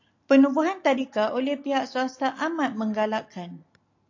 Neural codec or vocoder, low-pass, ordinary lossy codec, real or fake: none; 7.2 kHz; AAC, 48 kbps; real